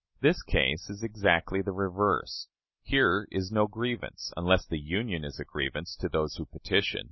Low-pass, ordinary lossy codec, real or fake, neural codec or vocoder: 7.2 kHz; MP3, 24 kbps; real; none